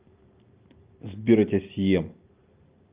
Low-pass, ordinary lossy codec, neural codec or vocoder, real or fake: 3.6 kHz; Opus, 32 kbps; none; real